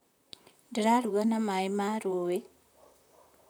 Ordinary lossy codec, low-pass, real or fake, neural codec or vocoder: none; none; fake; vocoder, 44.1 kHz, 128 mel bands, Pupu-Vocoder